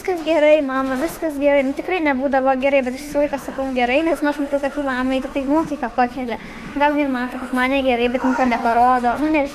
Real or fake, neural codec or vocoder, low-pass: fake; autoencoder, 48 kHz, 32 numbers a frame, DAC-VAE, trained on Japanese speech; 14.4 kHz